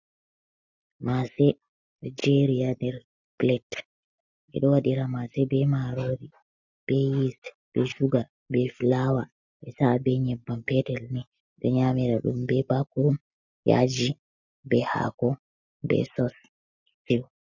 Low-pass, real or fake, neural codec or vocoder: 7.2 kHz; real; none